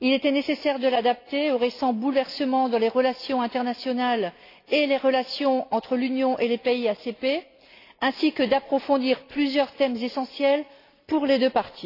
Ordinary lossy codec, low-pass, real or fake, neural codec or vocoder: AAC, 32 kbps; 5.4 kHz; real; none